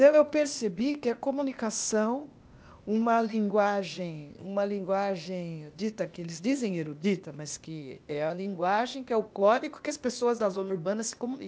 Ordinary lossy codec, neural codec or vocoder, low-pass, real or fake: none; codec, 16 kHz, 0.8 kbps, ZipCodec; none; fake